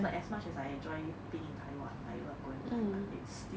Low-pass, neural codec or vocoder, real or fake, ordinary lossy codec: none; none; real; none